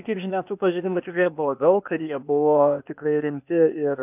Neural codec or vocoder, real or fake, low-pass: codec, 16 kHz, 0.8 kbps, ZipCodec; fake; 3.6 kHz